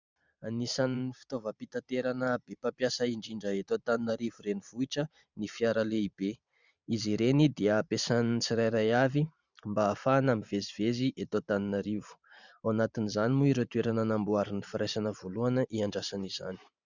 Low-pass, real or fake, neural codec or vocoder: 7.2 kHz; fake; vocoder, 44.1 kHz, 128 mel bands every 512 samples, BigVGAN v2